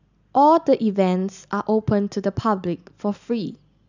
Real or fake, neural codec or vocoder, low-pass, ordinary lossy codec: real; none; 7.2 kHz; none